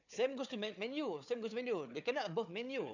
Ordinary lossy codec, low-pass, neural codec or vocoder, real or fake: none; 7.2 kHz; codec, 16 kHz, 4 kbps, FunCodec, trained on Chinese and English, 50 frames a second; fake